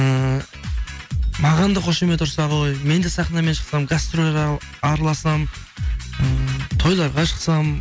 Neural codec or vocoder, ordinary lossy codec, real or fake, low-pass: none; none; real; none